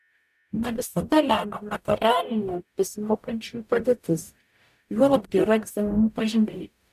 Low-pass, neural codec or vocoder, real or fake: 14.4 kHz; codec, 44.1 kHz, 0.9 kbps, DAC; fake